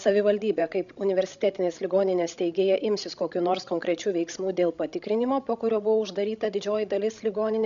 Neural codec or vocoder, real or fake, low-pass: codec, 16 kHz, 16 kbps, FreqCodec, larger model; fake; 7.2 kHz